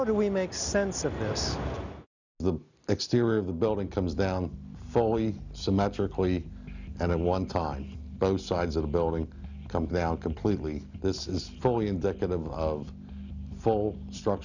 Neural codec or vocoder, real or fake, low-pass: none; real; 7.2 kHz